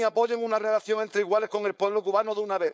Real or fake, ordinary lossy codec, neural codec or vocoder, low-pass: fake; none; codec, 16 kHz, 4.8 kbps, FACodec; none